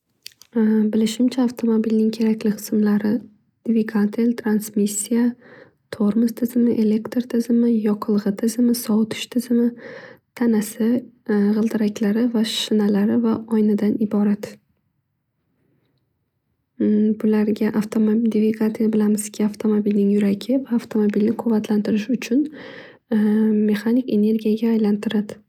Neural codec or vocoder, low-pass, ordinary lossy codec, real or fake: none; 19.8 kHz; none; real